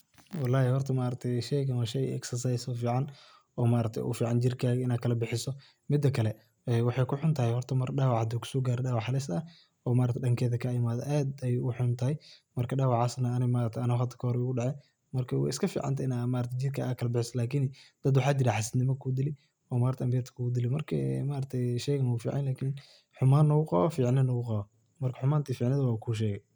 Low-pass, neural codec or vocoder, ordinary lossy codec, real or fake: none; none; none; real